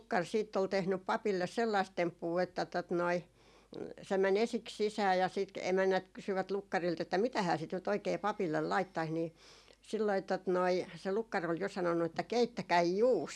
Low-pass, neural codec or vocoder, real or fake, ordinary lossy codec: none; none; real; none